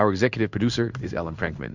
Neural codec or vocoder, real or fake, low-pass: codec, 16 kHz in and 24 kHz out, 1 kbps, XY-Tokenizer; fake; 7.2 kHz